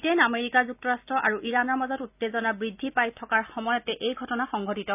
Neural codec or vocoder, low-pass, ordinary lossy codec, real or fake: none; 3.6 kHz; none; real